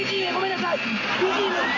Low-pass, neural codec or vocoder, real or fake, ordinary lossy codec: 7.2 kHz; none; real; AAC, 48 kbps